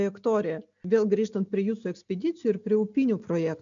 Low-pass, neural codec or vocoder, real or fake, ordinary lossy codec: 7.2 kHz; none; real; MP3, 96 kbps